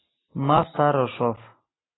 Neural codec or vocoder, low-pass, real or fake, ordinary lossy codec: none; 7.2 kHz; real; AAC, 16 kbps